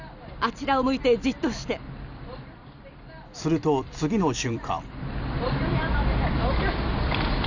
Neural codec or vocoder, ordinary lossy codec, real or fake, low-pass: vocoder, 44.1 kHz, 128 mel bands every 256 samples, BigVGAN v2; none; fake; 7.2 kHz